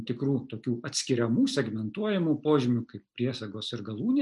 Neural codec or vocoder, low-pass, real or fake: none; 10.8 kHz; real